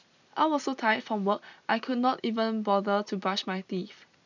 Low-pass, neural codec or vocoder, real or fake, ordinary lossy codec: 7.2 kHz; none; real; none